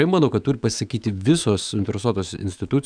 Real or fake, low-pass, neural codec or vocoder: fake; 9.9 kHz; autoencoder, 48 kHz, 128 numbers a frame, DAC-VAE, trained on Japanese speech